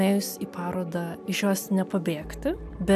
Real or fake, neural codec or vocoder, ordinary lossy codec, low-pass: real; none; AAC, 96 kbps; 14.4 kHz